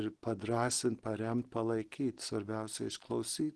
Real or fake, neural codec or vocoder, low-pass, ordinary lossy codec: real; none; 10.8 kHz; Opus, 16 kbps